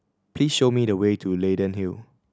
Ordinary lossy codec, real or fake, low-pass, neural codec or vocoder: none; real; none; none